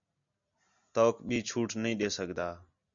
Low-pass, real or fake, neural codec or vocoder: 7.2 kHz; real; none